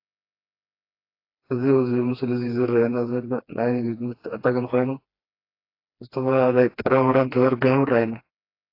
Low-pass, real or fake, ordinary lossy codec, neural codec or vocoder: 5.4 kHz; fake; AAC, 32 kbps; codec, 16 kHz, 2 kbps, FreqCodec, smaller model